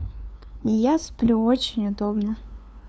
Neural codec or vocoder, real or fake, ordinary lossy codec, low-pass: codec, 16 kHz, 2 kbps, FunCodec, trained on LibriTTS, 25 frames a second; fake; none; none